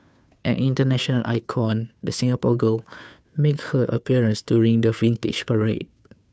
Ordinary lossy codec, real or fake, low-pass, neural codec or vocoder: none; fake; none; codec, 16 kHz, 2 kbps, FunCodec, trained on Chinese and English, 25 frames a second